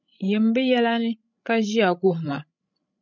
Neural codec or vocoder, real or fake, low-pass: codec, 16 kHz, 16 kbps, FreqCodec, larger model; fake; 7.2 kHz